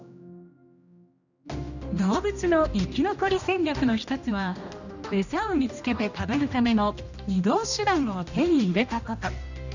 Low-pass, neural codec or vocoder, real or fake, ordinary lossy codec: 7.2 kHz; codec, 16 kHz, 1 kbps, X-Codec, HuBERT features, trained on general audio; fake; none